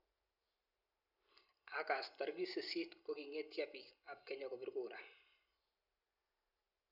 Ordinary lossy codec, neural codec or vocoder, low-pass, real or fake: none; none; 5.4 kHz; real